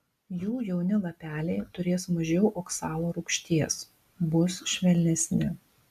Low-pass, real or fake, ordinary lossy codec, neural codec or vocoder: 14.4 kHz; real; MP3, 96 kbps; none